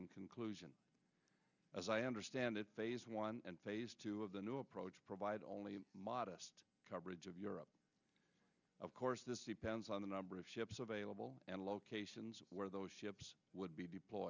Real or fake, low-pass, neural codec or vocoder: real; 7.2 kHz; none